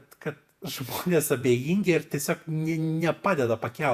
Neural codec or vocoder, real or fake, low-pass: vocoder, 48 kHz, 128 mel bands, Vocos; fake; 14.4 kHz